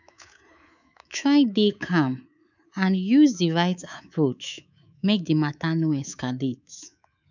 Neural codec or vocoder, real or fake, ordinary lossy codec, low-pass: codec, 24 kHz, 3.1 kbps, DualCodec; fake; none; 7.2 kHz